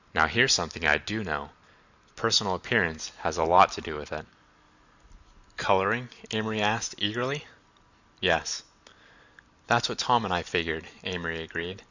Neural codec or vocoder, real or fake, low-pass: none; real; 7.2 kHz